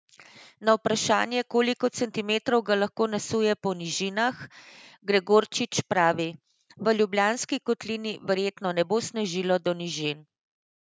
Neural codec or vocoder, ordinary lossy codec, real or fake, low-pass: none; none; real; none